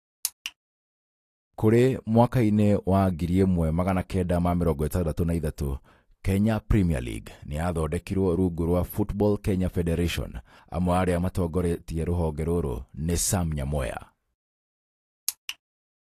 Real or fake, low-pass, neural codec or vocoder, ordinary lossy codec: real; 14.4 kHz; none; AAC, 48 kbps